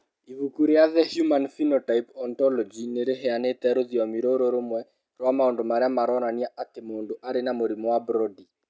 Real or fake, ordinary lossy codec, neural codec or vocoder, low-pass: real; none; none; none